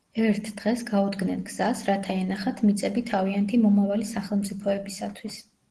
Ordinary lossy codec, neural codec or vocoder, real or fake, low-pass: Opus, 16 kbps; none; real; 10.8 kHz